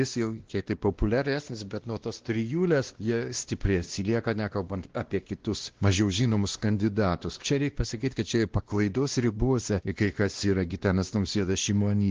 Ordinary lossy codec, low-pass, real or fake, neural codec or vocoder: Opus, 16 kbps; 7.2 kHz; fake; codec, 16 kHz, 1 kbps, X-Codec, WavLM features, trained on Multilingual LibriSpeech